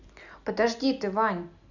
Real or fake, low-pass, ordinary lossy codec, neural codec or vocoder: real; 7.2 kHz; none; none